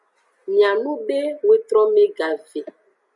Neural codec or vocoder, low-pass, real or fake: none; 10.8 kHz; real